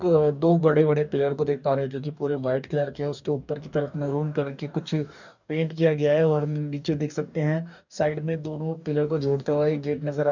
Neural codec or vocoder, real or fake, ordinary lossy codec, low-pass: codec, 44.1 kHz, 2.6 kbps, DAC; fake; none; 7.2 kHz